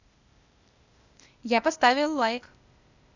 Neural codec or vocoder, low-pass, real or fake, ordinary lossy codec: codec, 16 kHz, 0.8 kbps, ZipCodec; 7.2 kHz; fake; none